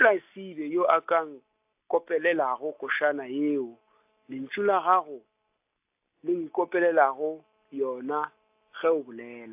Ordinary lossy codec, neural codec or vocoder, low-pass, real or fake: AAC, 32 kbps; none; 3.6 kHz; real